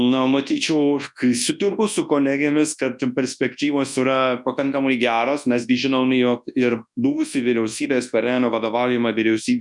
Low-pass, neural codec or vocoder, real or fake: 10.8 kHz; codec, 24 kHz, 0.9 kbps, WavTokenizer, large speech release; fake